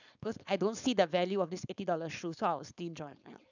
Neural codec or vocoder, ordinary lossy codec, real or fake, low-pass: codec, 16 kHz, 4.8 kbps, FACodec; none; fake; 7.2 kHz